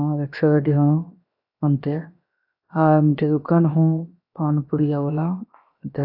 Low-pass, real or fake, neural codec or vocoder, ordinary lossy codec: 5.4 kHz; fake; codec, 16 kHz, 0.7 kbps, FocalCodec; AAC, 32 kbps